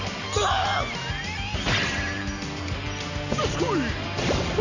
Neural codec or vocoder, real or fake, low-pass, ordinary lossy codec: none; real; 7.2 kHz; none